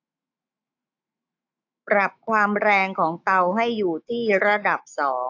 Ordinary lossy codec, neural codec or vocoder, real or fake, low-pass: AAC, 48 kbps; autoencoder, 48 kHz, 128 numbers a frame, DAC-VAE, trained on Japanese speech; fake; 7.2 kHz